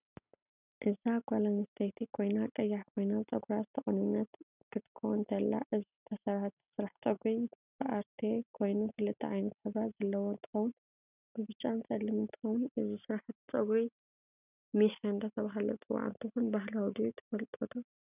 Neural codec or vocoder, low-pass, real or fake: none; 3.6 kHz; real